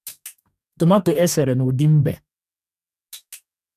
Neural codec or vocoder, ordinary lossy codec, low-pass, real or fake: codec, 44.1 kHz, 2.6 kbps, DAC; none; 14.4 kHz; fake